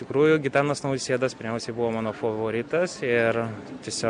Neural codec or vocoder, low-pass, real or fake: none; 9.9 kHz; real